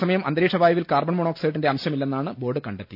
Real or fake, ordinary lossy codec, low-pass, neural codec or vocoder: real; none; 5.4 kHz; none